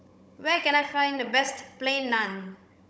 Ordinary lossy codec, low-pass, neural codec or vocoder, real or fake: none; none; codec, 16 kHz, 16 kbps, FunCodec, trained on Chinese and English, 50 frames a second; fake